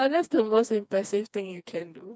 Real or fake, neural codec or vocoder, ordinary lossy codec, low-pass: fake; codec, 16 kHz, 2 kbps, FreqCodec, smaller model; none; none